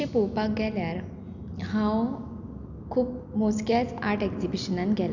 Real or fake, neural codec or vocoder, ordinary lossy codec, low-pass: real; none; none; 7.2 kHz